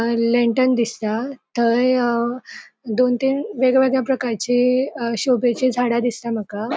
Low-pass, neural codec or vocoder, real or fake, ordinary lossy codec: none; none; real; none